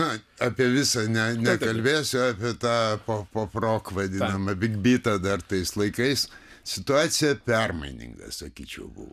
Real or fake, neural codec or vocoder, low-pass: real; none; 14.4 kHz